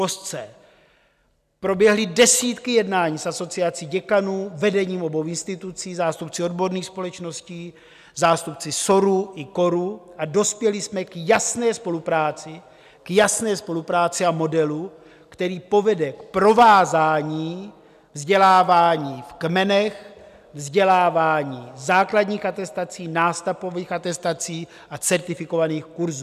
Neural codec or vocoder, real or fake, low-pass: none; real; 14.4 kHz